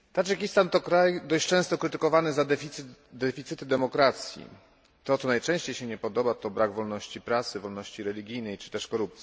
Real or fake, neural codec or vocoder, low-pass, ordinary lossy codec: real; none; none; none